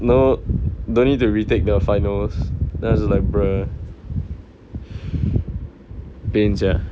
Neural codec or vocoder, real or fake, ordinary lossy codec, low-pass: none; real; none; none